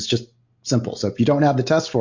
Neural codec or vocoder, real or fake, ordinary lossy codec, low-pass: none; real; MP3, 48 kbps; 7.2 kHz